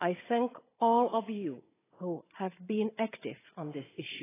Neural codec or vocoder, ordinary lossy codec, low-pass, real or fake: codec, 16 kHz, 16 kbps, FunCodec, trained on Chinese and English, 50 frames a second; AAC, 16 kbps; 3.6 kHz; fake